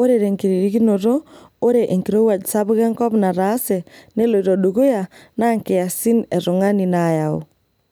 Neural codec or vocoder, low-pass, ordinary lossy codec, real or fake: none; none; none; real